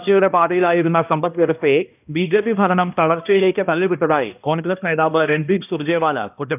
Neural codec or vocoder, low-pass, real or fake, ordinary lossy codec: codec, 16 kHz, 1 kbps, X-Codec, HuBERT features, trained on balanced general audio; 3.6 kHz; fake; none